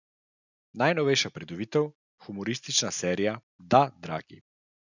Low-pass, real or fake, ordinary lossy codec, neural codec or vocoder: 7.2 kHz; real; none; none